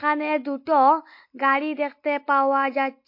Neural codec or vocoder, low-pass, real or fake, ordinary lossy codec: none; 5.4 kHz; real; MP3, 32 kbps